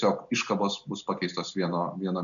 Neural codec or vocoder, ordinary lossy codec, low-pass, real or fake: none; MP3, 96 kbps; 7.2 kHz; real